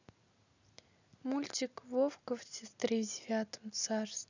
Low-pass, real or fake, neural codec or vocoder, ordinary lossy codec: 7.2 kHz; real; none; none